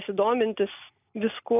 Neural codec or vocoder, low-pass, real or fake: none; 3.6 kHz; real